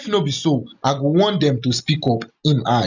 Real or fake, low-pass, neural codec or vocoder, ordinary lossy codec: real; 7.2 kHz; none; none